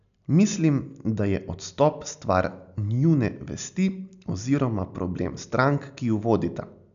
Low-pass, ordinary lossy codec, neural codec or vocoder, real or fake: 7.2 kHz; none; none; real